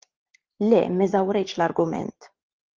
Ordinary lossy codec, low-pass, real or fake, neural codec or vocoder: Opus, 16 kbps; 7.2 kHz; fake; vocoder, 44.1 kHz, 128 mel bands every 512 samples, BigVGAN v2